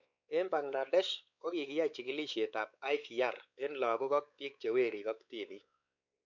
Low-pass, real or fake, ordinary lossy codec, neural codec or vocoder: 7.2 kHz; fake; none; codec, 16 kHz, 4 kbps, X-Codec, WavLM features, trained on Multilingual LibriSpeech